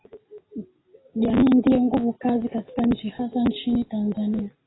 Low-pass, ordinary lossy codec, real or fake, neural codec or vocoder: 7.2 kHz; AAC, 16 kbps; real; none